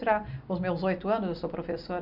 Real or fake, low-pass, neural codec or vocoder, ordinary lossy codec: real; 5.4 kHz; none; none